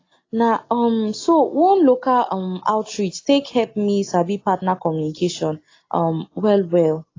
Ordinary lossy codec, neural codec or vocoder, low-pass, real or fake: AAC, 32 kbps; none; 7.2 kHz; real